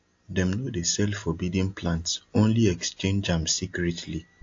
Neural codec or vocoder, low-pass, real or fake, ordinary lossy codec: none; 7.2 kHz; real; AAC, 48 kbps